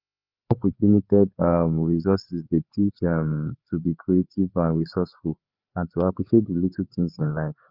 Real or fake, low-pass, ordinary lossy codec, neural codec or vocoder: fake; 5.4 kHz; Opus, 24 kbps; codec, 16 kHz, 4 kbps, FreqCodec, larger model